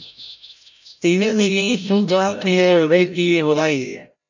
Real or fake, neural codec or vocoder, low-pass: fake; codec, 16 kHz, 0.5 kbps, FreqCodec, larger model; 7.2 kHz